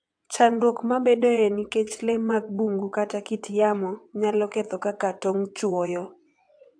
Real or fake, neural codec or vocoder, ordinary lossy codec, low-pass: fake; vocoder, 22.05 kHz, 80 mel bands, WaveNeXt; AAC, 64 kbps; 9.9 kHz